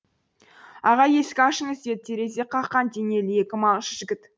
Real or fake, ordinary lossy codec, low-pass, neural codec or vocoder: real; none; none; none